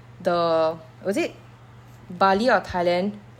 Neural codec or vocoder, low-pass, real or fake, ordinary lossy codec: none; 19.8 kHz; real; none